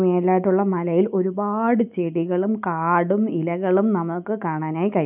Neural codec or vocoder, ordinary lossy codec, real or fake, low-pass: none; none; real; 3.6 kHz